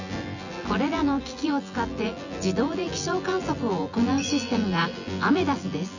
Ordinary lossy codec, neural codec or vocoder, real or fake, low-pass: none; vocoder, 24 kHz, 100 mel bands, Vocos; fake; 7.2 kHz